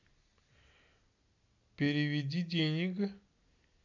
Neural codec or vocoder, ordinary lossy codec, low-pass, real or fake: none; none; 7.2 kHz; real